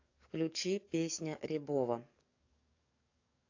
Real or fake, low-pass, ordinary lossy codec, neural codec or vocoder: fake; 7.2 kHz; AAC, 48 kbps; codec, 44.1 kHz, 7.8 kbps, DAC